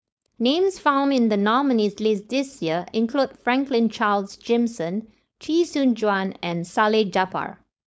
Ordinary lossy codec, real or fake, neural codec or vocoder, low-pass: none; fake; codec, 16 kHz, 4.8 kbps, FACodec; none